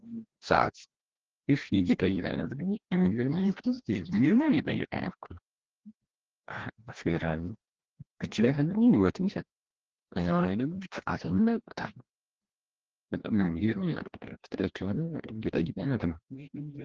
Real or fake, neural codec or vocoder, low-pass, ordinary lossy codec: fake; codec, 16 kHz, 1 kbps, FreqCodec, larger model; 7.2 kHz; Opus, 16 kbps